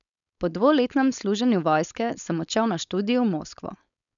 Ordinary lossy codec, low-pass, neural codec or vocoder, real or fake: none; 7.2 kHz; codec, 16 kHz, 4.8 kbps, FACodec; fake